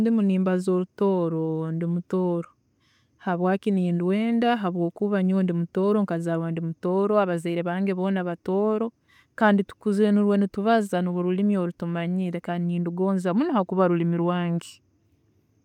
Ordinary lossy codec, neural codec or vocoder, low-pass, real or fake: none; none; 19.8 kHz; real